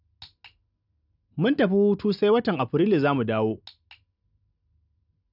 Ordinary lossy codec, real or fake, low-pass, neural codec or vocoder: none; real; 5.4 kHz; none